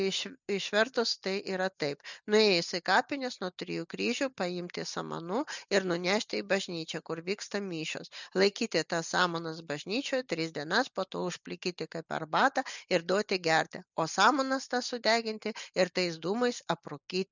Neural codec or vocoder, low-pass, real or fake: none; 7.2 kHz; real